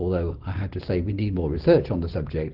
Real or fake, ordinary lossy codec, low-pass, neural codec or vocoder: real; Opus, 16 kbps; 5.4 kHz; none